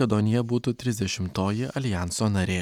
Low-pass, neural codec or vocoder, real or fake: 19.8 kHz; none; real